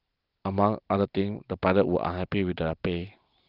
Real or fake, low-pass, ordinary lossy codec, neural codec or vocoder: real; 5.4 kHz; Opus, 32 kbps; none